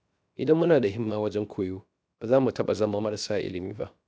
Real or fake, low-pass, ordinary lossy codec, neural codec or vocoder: fake; none; none; codec, 16 kHz, 0.7 kbps, FocalCodec